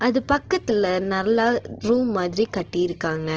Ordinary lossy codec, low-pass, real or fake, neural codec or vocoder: Opus, 16 kbps; 7.2 kHz; real; none